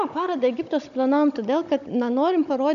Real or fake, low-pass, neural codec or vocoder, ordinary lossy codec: fake; 7.2 kHz; codec, 16 kHz, 16 kbps, FunCodec, trained on Chinese and English, 50 frames a second; AAC, 96 kbps